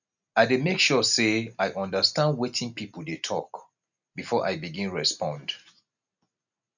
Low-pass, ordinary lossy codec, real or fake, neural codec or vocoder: 7.2 kHz; none; real; none